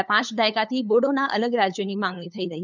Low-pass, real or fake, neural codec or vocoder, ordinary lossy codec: 7.2 kHz; fake; codec, 16 kHz, 8 kbps, FunCodec, trained on LibriTTS, 25 frames a second; none